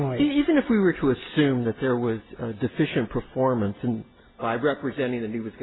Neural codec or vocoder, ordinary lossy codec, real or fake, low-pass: none; AAC, 16 kbps; real; 7.2 kHz